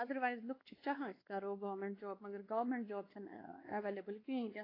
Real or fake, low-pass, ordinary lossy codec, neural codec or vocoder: fake; 5.4 kHz; AAC, 24 kbps; codec, 16 kHz, 2 kbps, X-Codec, WavLM features, trained on Multilingual LibriSpeech